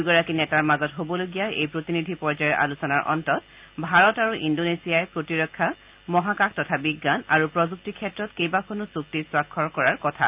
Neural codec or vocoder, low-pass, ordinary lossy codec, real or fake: none; 3.6 kHz; Opus, 24 kbps; real